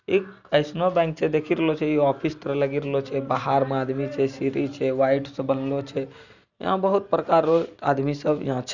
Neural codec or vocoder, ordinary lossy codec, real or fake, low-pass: none; none; real; 7.2 kHz